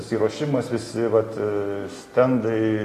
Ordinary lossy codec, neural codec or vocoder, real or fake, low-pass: AAC, 48 kbps; none; real; 14.4 kHz